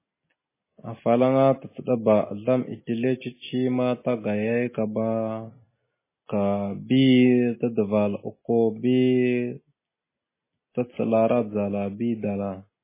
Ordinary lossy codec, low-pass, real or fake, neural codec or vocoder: MP3, 16 kbps; 3.6 kHz; real; none